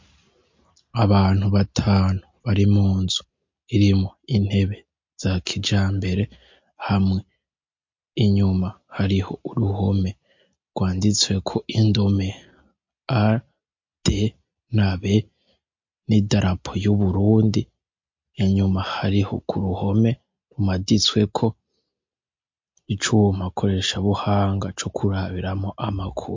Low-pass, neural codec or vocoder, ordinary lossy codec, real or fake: 7.2 kHz; none; MP3, 48 kbps; real